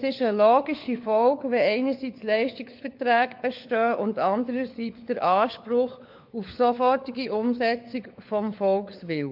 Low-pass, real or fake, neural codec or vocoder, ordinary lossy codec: 5.4 kHz; fake; codec, 16 kHz, 4 kbps, FunCodec, trained on LibriTTS, 50 frames a second; MP3, 48 kbps